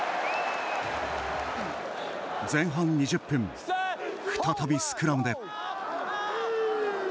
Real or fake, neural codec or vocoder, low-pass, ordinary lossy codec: real; none; none; none